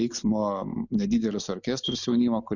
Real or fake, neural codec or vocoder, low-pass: real; none; 7.2 kHz